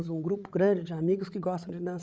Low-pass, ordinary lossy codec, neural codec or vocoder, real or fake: none; none; codec, 16 kHz, 16 kbps, FreqCodec, larger model; fake